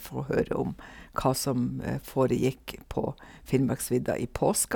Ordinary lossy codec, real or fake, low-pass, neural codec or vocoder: none; real; none; none